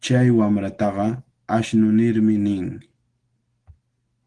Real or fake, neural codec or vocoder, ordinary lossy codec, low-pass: real; none; Opus, 16 kbps; 9.9 kHz